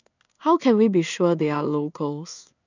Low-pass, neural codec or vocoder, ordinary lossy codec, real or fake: 7.2 kHz; codec, 16 kHz in and 24 kHz out, 1 kbps, XY-Tokenizer; none; fake